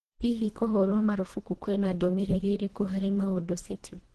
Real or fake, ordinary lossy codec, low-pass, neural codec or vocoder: fake; Opus, 16 kbps; 10.8 kHz; codec, 24 kHz, 1.5 kbps, HILCodec